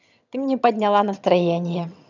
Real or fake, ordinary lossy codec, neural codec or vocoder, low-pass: fake; none; vocoder, 22.05 kHz, 80 mel bands, HiFi-GAN; 7.2 kHz